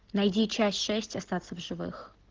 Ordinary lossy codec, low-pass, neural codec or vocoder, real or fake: Opus, 16 kbps; 7.2 kHz; none; real